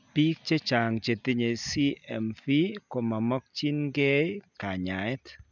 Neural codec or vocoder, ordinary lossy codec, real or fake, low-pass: none; none; real; 7.2 kHz